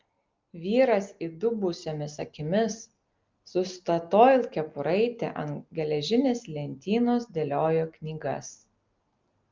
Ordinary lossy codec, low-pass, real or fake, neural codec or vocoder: Opus, 24 kbps; 7.2 kHz; real; none